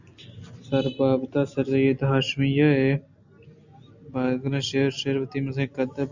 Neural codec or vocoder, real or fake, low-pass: none; real; 7.2 kHz